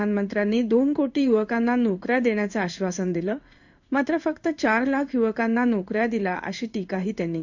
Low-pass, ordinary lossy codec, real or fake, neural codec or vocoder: 7.2 kHz; none; fake; codec, 16 kHz in and 24 kHz out, 1 kbps, XY-Tokenizer